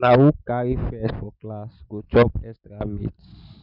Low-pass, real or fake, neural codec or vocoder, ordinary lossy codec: 5.4 kHz; real; none; none